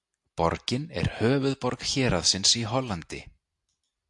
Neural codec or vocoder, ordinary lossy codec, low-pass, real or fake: none; AAC, 64 kbps; 10.8 kHz; real